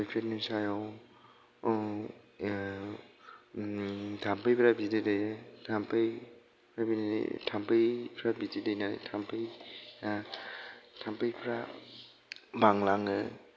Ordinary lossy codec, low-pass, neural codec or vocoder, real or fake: none; none; none; real